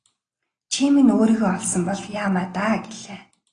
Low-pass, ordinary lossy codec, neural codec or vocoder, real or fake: 9.9 kHz; AAC, 32 kbps; none; real